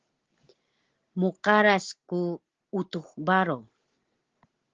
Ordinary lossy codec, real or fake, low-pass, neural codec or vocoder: Opus, 16 kbps; real; 7.2 kHz; none